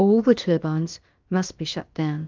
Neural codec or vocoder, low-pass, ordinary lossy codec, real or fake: codec, 16 kHz, about 1 kbps, DyCAST, with the encoder's durations; 7.2 kHz; Opus, 32 kbps; fake